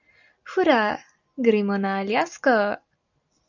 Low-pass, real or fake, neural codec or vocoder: 7.2 kHz; real; none